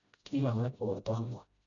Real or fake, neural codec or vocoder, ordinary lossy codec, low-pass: fake; codec, 16 kHz, 0.5 kbps, FreqCodec, smaller model; none; 7.2 kHz